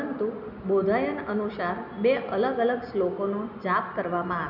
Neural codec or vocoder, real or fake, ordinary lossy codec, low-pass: none; real; none; 5.4 kHz